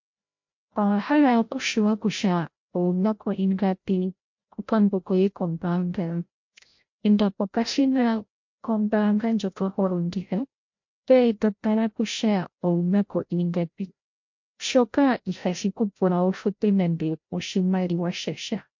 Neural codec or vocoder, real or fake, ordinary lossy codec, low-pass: codec, 16 kHz, 0.5 kbps, FreqCodec, larger model; fake; MP3, 48 kbps; 7.2 kHz